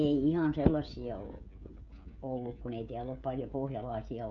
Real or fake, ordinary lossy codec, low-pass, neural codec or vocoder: fake; none; 7.2 kHz; codec, 16 kHz, 16 kbps, FreqCodec, smaller model